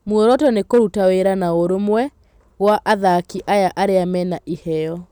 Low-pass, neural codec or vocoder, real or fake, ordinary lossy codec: 19.8 kHz; none; real; none